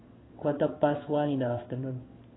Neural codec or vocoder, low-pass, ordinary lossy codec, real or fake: codec, 16 kHz in and 24 kHz out, 1 kbps, XY-Tokenizer; 7.2 kHz; AAC, 16 kbps; fake